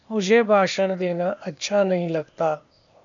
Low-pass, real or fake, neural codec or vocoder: 7.2 kHz; fake; codec, 16 kHz, 0.8 kbps, ZipCodec